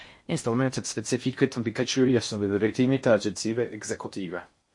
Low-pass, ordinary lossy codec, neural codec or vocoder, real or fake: 10.8 kHz; MP3, 48 kbps; codec, 16 kHz in and 24 kHz out, 0.6 kbps, FocalCodec, streaming, 4096 codes; fake